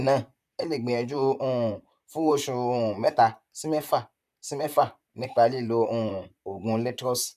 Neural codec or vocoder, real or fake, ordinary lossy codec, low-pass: vocoder, 44.1 kHz, 128 mel bands, Pupu-Vocoder; fake; none; 14.4 kHz